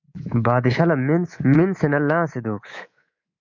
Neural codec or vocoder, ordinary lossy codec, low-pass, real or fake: codec, 24 kHz, 3.1 kbps, DualCodec; MP3, 48 kbps; 7.2 kHz; fake